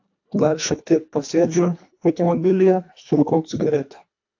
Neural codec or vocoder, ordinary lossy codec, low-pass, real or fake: codec, 24 kHz, 1.5 kbps, HILCodec; AAC, 48 kbps; 7.2 kHz; fake